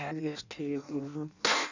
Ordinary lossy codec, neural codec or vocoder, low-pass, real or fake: none; codec, 16 kHz in and 24 kHz out, 0.6 kbps, FireRedTTS-2 codec; 7.2 kHz; fake